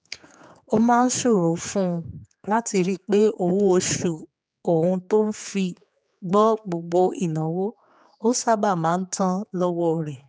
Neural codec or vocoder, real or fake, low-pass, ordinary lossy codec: codec, 16 kHz, 4 kbps, X-Codec, HuBERT features, trained on general audio; fake; none; none